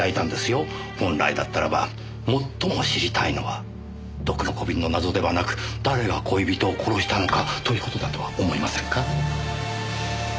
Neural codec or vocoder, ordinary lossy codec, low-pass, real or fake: none; none; none; real